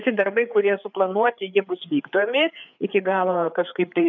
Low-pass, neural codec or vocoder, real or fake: 7.2 kHz; codec, 16 kHz, 4 kbps, FreqCodec, larger model; fake